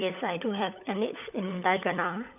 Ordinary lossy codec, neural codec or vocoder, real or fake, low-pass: none; codec, 16 kHz, 16 kbps, FunCodec, trained on LibriTTS, 50 frames a second; fake; 3.6 kHz